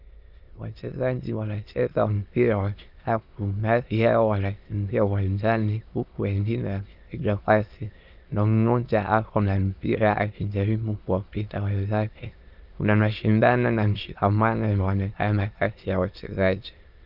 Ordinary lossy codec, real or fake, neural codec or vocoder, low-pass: Opus, 32 kbps; fake; autoencoder, 22.05 kHz, a latent of 192 numbers a frame, VITS, trained on many speakers; 5.4 kHz